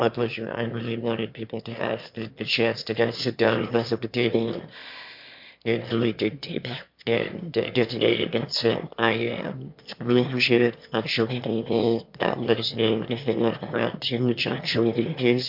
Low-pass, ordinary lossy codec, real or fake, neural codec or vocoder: 5.4 kHz; MP3, 48 kbps; fake; autoencoder, 22.05 kHz, a latent of 192 numbers a frame, VITS, trained on one speaker